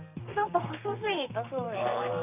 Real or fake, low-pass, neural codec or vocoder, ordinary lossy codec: fake; 3.6 kHz; codec, 32 kHz, 1.9 kbps, SNAC; none